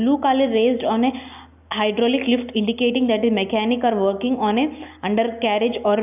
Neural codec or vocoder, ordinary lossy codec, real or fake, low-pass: none; none; real; 3.6 kHz